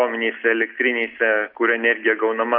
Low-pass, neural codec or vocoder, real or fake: 5.4 kHz; none; real